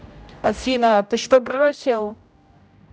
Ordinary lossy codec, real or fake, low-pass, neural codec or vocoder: none; fake; none; codec, 16 kHz, 0.5 kbps, X-Codec, HuBERT features, trained on general audio